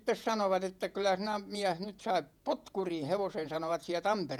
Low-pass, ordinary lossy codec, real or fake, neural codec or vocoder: 19.8 kHz; none; fake; vocoder, 48 kHz, 128 mel bands, Vocos